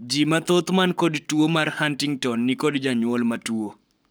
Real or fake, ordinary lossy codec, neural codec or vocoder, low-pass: fake; none; codec, 44.1 kHz, 7.8 kbps, Pupu-Codec; none